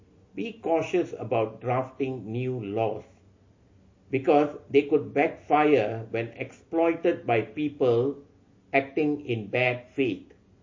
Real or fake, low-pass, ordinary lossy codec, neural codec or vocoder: real; 7.2 kHz; MP3, 32 kbps; none